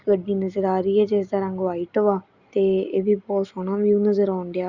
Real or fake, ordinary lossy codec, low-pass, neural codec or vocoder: real; Opus, 64 kbps; 7.2 kHz; none